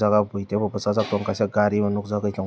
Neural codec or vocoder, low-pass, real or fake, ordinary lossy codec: none; none; real; none